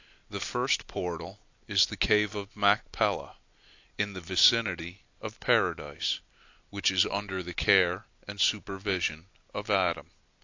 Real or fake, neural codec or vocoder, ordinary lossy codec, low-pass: real; none; AAC, 48 kbps; 7.2 kHz